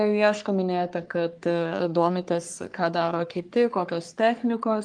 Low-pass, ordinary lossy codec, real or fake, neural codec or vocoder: 9.9 kHz; Opus, 24 kbps; fake; codec, 44.1 kHz, 3.4 kbps, Pupu-Codec